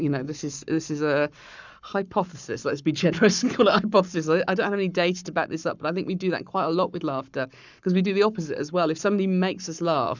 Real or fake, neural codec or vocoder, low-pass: fake; codec, 44.1 kHz, 7.8 kbps, Pupu-Codec; 7.2 kHz